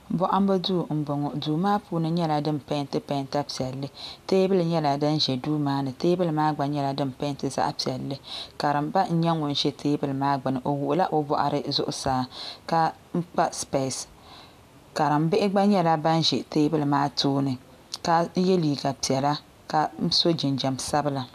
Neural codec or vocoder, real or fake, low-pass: none; real; 14.4 kHz